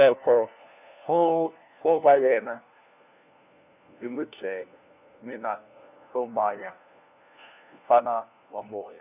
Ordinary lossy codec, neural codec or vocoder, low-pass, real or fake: none; codec, 16 kHz, 1 kbps, FunCodec, trained on LibriTTS, 50 frames a second; 3.6 kHz; fake